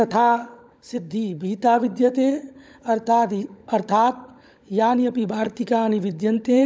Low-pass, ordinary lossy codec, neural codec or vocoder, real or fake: none; none; codec, 16 kHz, 16 kbps, FunCodec, trained on LibriTTS, 50 frames a second; fake